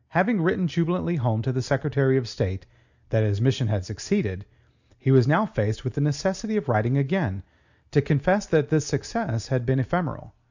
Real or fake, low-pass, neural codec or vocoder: real; 7.2 kHz; none